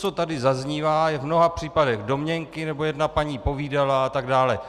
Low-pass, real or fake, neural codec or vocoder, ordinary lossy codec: 14.4 kHz; real; none; Opus, 64 kbps